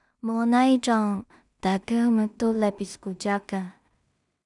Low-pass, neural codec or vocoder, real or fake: 10.8 kHz; codec, 16 kHz in and 24 kHz out, 0.4 kbps, LongCat-Audio-Codec, two codebook decoder; fake